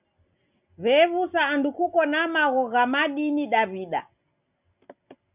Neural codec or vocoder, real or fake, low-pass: none; real; 3.6 kHz